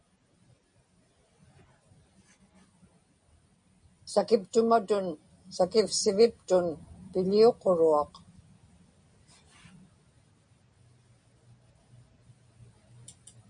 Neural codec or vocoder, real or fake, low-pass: none; real; 9.9 kHz